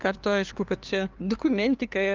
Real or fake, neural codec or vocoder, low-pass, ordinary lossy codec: fake; codec, 16 kHz, 2 kbps, FunCodec, trained on LibriTTS, 25 frames a second; 7.2 kHz; Opus, 24 kbps